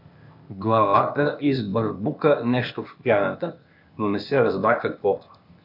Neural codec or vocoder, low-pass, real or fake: codec, 16 kHz, 0.8 kbps, ZipCodec; 5.4 kHz; fake